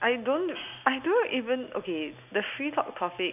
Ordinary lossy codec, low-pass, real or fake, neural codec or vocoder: none; 3.6 kHz; real; none